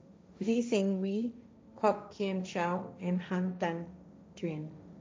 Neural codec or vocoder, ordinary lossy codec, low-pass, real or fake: codec, 16 kHz, 1.1 kbps, Voila-Tokenizer; none; none; fake